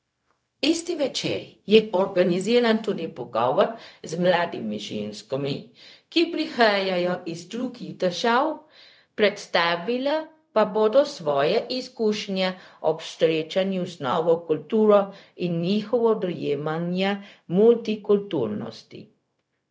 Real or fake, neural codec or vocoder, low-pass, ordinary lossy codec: fake; codec, 16 kHz, 0.4 kbps, LongCat-Audio-Codec; none; none